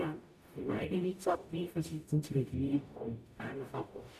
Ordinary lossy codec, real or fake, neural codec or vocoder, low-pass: MP3, 96 kbps; fake; codec, 44.1 kHz, 0.9 kbps, DAC; 14.4 kHz